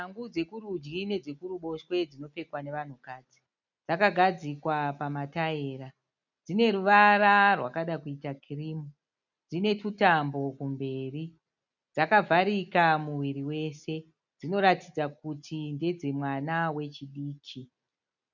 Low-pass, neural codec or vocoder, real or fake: 7.2 kHz; none; real